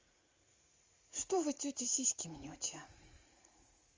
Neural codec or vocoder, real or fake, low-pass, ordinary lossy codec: vocoder, 44.1 kHz, 80 mel bands, Vocos; fake; 7.2 kHz; Opus, 32 kbps